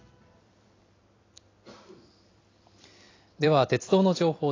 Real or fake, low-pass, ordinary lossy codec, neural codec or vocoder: fake; 7.2 kHz; AAC, 32 kbps; vocoder, 44.1 kHz, 128 mel bands every 256 samples, BigVGAN v2